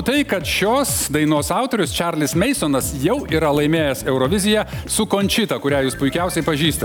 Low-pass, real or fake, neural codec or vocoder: 19.8 kHz; real; none